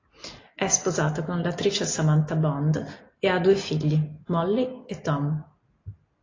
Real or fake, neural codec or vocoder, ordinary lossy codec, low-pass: real; none; AAC, 32 kbps; 7.2 kHz